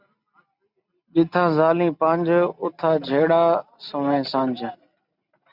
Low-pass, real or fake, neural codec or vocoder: 5.4 kHz; real; none